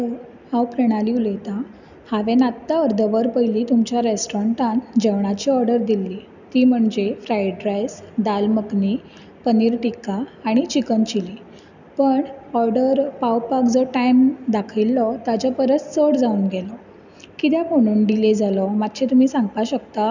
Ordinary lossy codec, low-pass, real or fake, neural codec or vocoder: none; 7.2 kHz; real; none